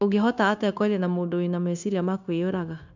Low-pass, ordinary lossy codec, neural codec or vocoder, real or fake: 7.2 kHz; none; codec, 16 kHz, 0.9 kbps, LongCat-Audio-Codec; fake